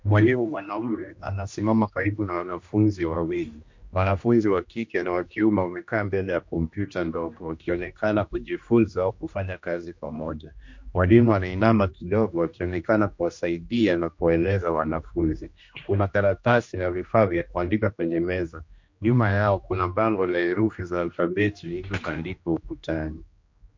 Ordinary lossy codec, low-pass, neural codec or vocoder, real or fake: MP3, 48 kbps; 7.2 kHz; codec, 16 kHz, 1 kbps, X-Codec, HuBERT features, trained on general audio; fake